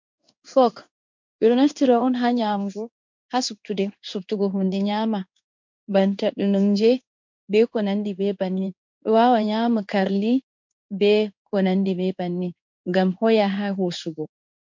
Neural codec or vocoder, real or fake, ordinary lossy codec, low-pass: codec, 16 kHz in and 24 kHz out, 1 kbps, XY-Tokenizer; fake; MP3, 64 kbps; 7.2 kHz